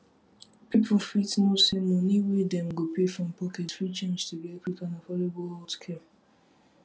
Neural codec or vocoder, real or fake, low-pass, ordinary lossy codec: none; real; none; none